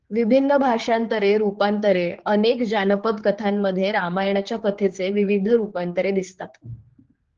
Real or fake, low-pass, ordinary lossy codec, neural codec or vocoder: fake; 7.2 kHz; Opus, 16 kbps; codec, 16 kHz, 4 kbps, X-Codec, HuBERT features, trained on general audio